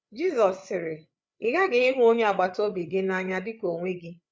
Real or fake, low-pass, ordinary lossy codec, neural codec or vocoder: fake; none; none; codec, 16 kHz, 8 kbps, FreqCodec, larger model